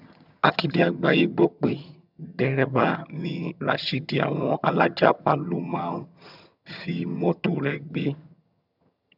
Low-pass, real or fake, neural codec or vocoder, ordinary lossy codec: 5.4 kHz; fake; vocoder, 22.05 kHz, 80 mel bands, HiFi-GAN; none